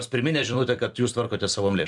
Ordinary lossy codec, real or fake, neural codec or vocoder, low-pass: MP3, 96 kbps; fake; vocoder, 44.1 kHz, 128 mel bands every 256 samples, BigVGAN v2; 10.8 kHz